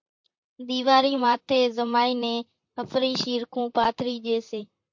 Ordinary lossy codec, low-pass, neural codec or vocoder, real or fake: MP3, 48 kbps; 7.2 kHz; vocoder, 44.1 kHz, 128 mel bands, Pupu-Vocoder; fake